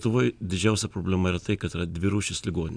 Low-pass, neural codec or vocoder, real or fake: 9.9 kHz; none; real